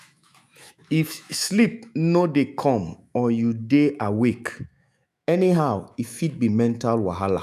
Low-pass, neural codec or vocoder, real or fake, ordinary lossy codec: 14.4 kHz; autoencoder, 48 kHz, 128 numbers a frame, DAC-VAE, trained on Japanese speech; fake; none